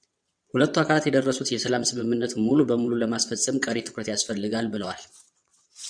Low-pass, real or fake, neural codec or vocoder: 9.9 kHz; fake; vocoder, 22.05 kHz, 80 mel bands, WaveNeXt